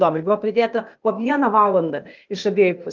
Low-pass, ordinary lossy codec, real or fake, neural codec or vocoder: 7.2 kHz; Opus, 32 kbps; fake; codec, 16 kHz, 0.8 kbps, ZipCodec